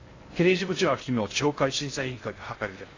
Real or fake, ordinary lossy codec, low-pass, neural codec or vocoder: fake; AAC, 32 kbps; 7.2 kHz; codec, 16 kHz in and 24 kHz out, 0.6 kbps, FocalCodec, streaming, 2048 codes